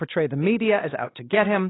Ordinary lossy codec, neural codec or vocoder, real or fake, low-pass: AAC, 16 kbps; codec, 16 kHz, 2 kbps, X-Codec, WavLM features, trained on Multilingual LibriSpeech; fake; 7.2 kHz